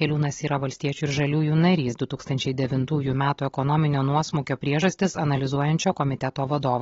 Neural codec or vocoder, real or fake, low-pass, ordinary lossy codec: none; real; 7.2 kHz; AAC, 24 kbps